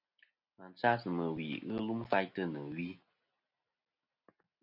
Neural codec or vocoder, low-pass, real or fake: none; 5.4 kHz; real